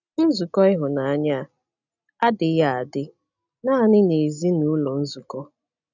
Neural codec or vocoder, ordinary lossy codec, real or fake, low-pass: none; none; real; 7.2 kHz